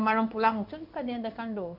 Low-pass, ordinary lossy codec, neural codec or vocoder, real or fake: 5.4 kHz; none; codec, 16 kHz in and 24 kHz out, 1 kbps, XY-Tokenizer; fake